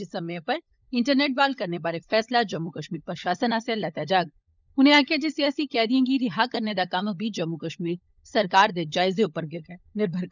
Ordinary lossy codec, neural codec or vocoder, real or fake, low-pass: none; codec, 16 kHz, 16 kbps, FunCodec, trained on LibriTTS, 50 frames a second; fake; 7.2 kHz